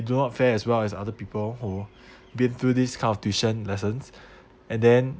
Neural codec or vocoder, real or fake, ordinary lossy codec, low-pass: none; real; none; none